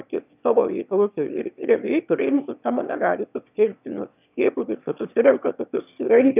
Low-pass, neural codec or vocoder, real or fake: 3.6 kHz; autoencoder, 22.05 kHz, a latent of 192 numbers a frame, VITS, trained on one speaker; fake